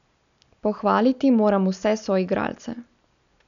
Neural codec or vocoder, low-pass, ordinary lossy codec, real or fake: none; 7.2 kHz; none; real